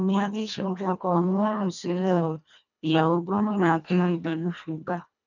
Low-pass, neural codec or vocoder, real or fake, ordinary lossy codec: 7.2 kHz; codec, 24 kHz, 1.5 kbps, HILCodec; fake; none